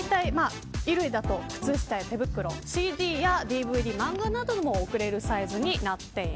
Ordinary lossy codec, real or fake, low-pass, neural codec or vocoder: none; real; none; none